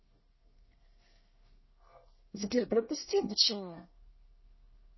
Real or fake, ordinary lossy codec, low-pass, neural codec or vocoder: fake; MP3, 24 kbps; 7.2 kHz; codec, 24 kHz, 1 kbps, SNAC